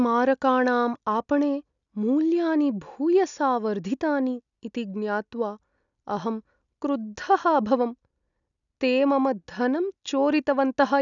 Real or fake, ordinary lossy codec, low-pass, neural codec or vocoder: real; none; 7.2 kHz; none